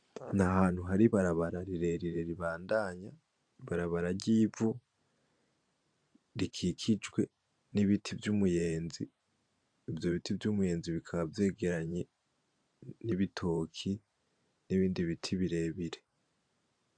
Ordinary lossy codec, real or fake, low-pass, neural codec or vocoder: AAC, 64 kbps; fake; 9.9 kHz; vocoder, 44.1 kHz, 128 mel bands every 512 samples, BigVGAN v2